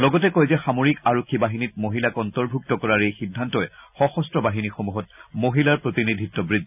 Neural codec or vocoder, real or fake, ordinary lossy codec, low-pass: none; real; none; 3.6 kHz